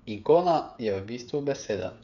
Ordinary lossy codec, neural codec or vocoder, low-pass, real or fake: none; codec, 16 kHz, 8 kbps, FreqCodec, smaller model; 7.2 kHz; fake